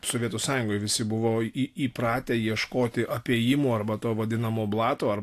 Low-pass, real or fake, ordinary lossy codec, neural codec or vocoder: 14.4 kHz; real; AAC, 64 kbps; none